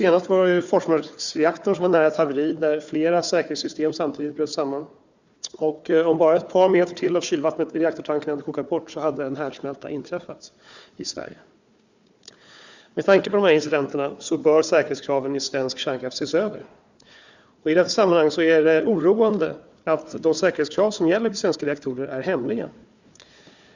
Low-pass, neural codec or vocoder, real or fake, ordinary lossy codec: 7.2 kHz; codec, 16 kHz, 4 kbps, FunCodec, trained on Chinese and English, 50 frames a second; fake; Opus, 64 kbps